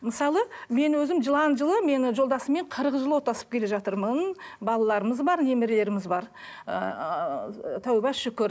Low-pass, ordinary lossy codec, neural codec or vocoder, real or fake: none; none; none; real